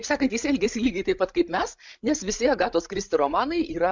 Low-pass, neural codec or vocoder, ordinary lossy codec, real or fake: 7.2 kHz; codec, 16 kHz, 16 kbps, FreqCodec, larger model; MP3, 64 kbps; fake